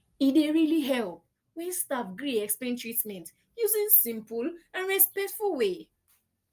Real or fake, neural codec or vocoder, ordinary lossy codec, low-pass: real; none; Opus, 32 kbps; 14.4 kHz